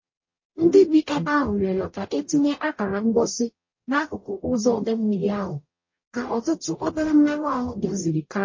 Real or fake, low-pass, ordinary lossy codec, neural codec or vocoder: fake; 7.2 kHz; MP3, 32 kbps; codec, 44.1 kHz, 0.9 kbps, DAC